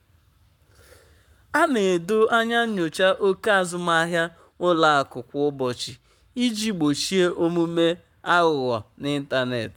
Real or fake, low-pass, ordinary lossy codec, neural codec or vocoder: fake; 19.8 kHz; none; codec, 44.1 kHz, 7.8 kbps, Pupu-Codec